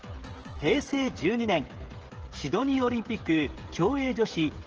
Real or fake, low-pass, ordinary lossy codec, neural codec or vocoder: fake; 7.2 kHz; Opus, 16 kbps; codec, 16 kHz, 16 kbps, FreqCodec, smaller model